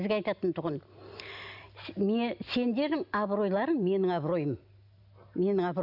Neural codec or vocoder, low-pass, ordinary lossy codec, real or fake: none; 5.4 kHz; none; real